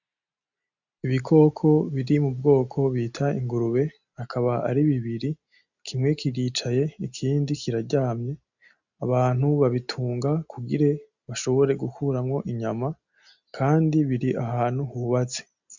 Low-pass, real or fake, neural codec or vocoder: 7.2 kHz; real; none